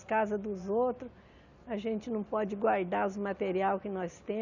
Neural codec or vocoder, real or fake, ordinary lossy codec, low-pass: none; real; none; 7.2 kHz